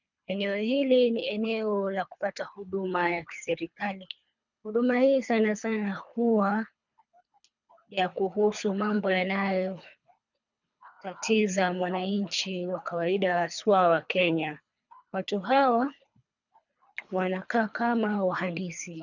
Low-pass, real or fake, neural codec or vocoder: 7.2 kHz; fake; codec, 24 kHz, 3 kbps, HILCodec